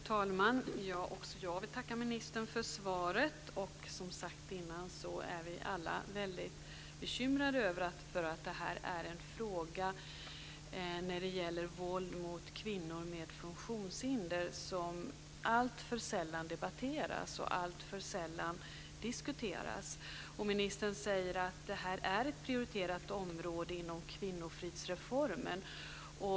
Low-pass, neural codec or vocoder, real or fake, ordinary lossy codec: none; none; real; none